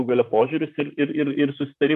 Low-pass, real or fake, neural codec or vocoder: 14.4 kHz; fake; vocoder, 44.1 kHz, 128 mel bands, Pupu-Vocoder